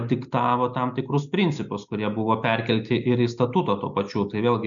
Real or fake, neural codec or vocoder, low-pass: real; none; 7.2 kHz